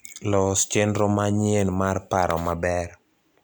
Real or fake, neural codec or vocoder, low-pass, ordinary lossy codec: real; none; none; none